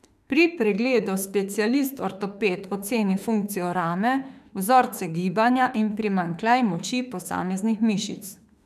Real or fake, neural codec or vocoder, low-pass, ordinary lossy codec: fake; autoencoder, 48 kHz, 32 numbers a frame, DAC-VAE, trained on Japanese speech; 14.4 kHz; none